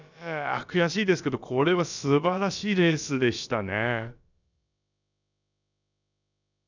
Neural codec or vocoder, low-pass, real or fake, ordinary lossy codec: codec, 16 kHz, about 1 kbps, DyCAST, with the encoder's durations; 7.2 kHz; fake; none